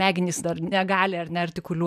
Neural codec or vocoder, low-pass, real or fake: vocoder, 44.1 kHz, 128 mel bands every 512 samples, BigVGAN v2; 14.4 kHz; fake